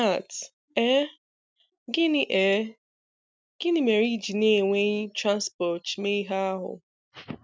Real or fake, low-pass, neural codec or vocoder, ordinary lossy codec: real; none; none; none